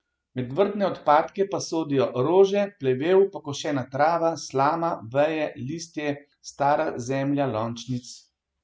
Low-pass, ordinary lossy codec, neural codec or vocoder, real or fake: none; none; none; real